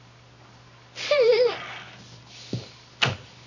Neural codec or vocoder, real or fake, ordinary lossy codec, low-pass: codec, 24 kHz, 0.9 kbps, WavTokenizer, medium music audio release; fake; none; 7.2 kHz